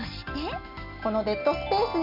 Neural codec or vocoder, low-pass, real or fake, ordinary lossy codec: none; 5.4 kHz; real; none